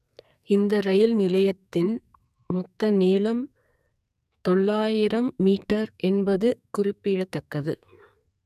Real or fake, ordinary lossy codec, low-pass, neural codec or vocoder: fake; none; 14.4 kHz; codec, 44.1 kHz, 2.6 kbps, SNAC